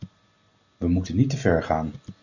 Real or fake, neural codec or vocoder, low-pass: real; none; 7.2 kHz